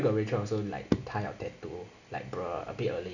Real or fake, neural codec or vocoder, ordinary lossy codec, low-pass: real; none; none; 7.2 kHz